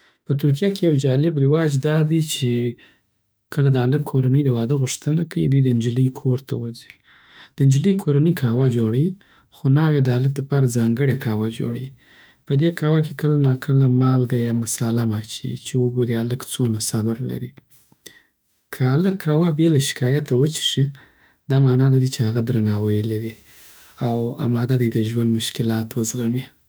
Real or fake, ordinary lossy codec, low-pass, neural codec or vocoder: fake; none; none; autoencoder, 48 kHz, 32 numbers a frame, DAC-VAE, trained on Japanese speech